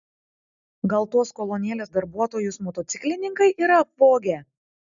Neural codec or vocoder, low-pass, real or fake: none; 7.2 kHz; real